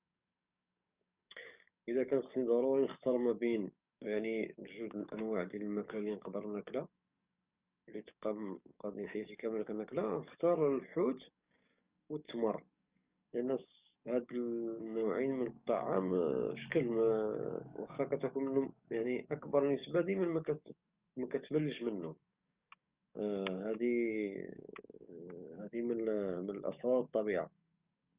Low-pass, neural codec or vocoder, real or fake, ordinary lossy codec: 3.6 kHz; codec, 44.1 kHz, 7.8 kbps, DAC; fake; Opus, 64 kbps